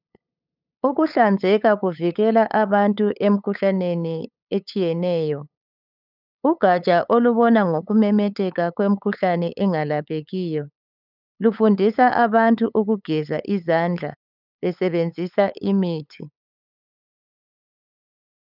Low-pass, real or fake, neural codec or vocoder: 5.4 kHz; fake; codec, 16 kHz, 8 kbps, FunCodec, trained on LibriTTS, 25 frames a second